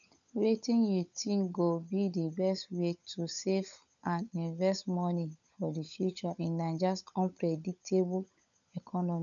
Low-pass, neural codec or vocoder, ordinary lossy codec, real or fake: 7.2 kHz; codec, 16 kHz, 16 kbps, FunCodec, trained on LibriTTS, 50 frames a second; none; fake